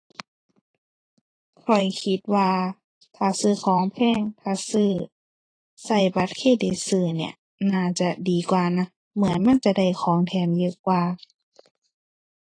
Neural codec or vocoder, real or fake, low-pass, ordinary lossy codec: vocoder, 44.1 kHz, 128 mel bands every 512 samples, BigVGAN v2; fake; 9.9 kHz; AAC, 32 kbps